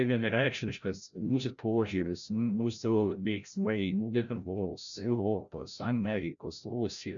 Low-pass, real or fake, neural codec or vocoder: 7.2 kHz; fake; codec, 16 kHz, 0.5 kbps, FreqCodec, larger model